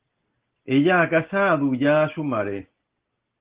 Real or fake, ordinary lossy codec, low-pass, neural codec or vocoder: real; Opus, 16 kbps; 3.6 kHz; none